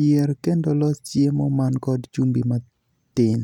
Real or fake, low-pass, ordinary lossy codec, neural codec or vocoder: real; 19.8 kHz; none; none